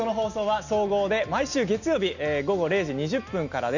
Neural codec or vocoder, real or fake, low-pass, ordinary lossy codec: none; real; 7.2 kHz; none